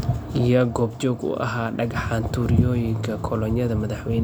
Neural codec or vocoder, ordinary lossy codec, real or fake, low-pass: none; none; real; none